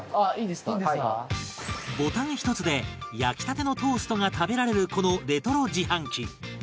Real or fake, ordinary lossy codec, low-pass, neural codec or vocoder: real; none; none; none